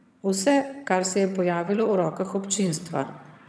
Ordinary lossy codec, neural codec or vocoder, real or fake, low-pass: none; vocoder, 22.05 kHz, 80 mel bands, HiFi-GAN; fake; none